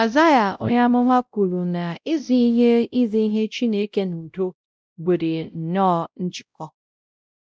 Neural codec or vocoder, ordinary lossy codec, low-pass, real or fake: codec, 16 kHz, 0.5 kbps, X-Codec, WavLM features, trained on Multilingual LibriSpeech; none; none; fake